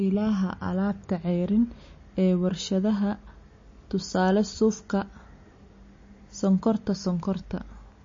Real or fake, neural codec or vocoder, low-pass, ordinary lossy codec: real; none; 7.2 kHz; MP3, 32 kbps